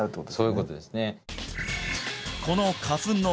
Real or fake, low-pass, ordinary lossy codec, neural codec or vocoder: real; none; none; none